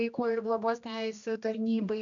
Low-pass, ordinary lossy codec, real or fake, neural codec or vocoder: 7.2 kHz; MP3, 96 kbps; fake; codec, 16 kHz, 1 kbps, X-Codec, HuBERT features, trained on general audio